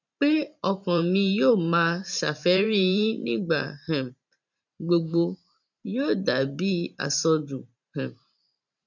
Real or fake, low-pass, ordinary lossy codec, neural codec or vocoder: fake; 7.2 kHz; none; vocoder, 44.1 kHz, 128 mel bands every 512 samples, BigVGAN v2